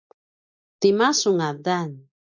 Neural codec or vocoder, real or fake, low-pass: none; real; 7.2 kHz